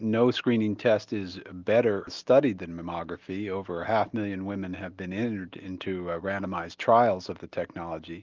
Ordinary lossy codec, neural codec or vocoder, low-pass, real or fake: Opus, 32 kbps; none; 7.2 kHz; real